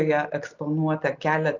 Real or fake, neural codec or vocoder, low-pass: real; none; 7.2 kHz